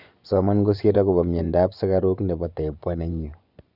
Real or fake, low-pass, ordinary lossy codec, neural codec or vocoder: fake; 5.4 kHz; none; vocoder, 24 kHz, 100 mel bands, Vocos